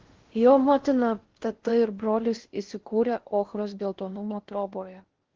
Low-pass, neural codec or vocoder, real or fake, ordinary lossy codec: 7.2 kHz; codec, 16 kHz in and 24 kHz out, 0.6 kbps, FocalCodec, streaming, 4096 codes; fake; Opus, 16 kbps